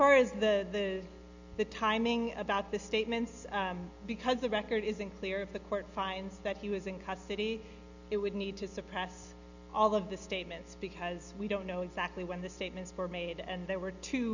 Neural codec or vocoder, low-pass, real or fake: none; 7.2 kHz; real